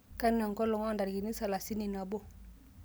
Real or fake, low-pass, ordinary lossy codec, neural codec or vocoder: real; none; none; none